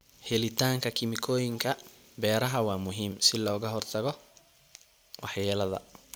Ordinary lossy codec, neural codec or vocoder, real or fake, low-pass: none; none; real; none